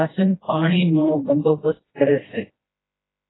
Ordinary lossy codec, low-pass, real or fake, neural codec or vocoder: AAC, 16 kbps; 7.2 kHz; fake; codec, 16 kHz, 1 kbps, FreqCodec, smaller model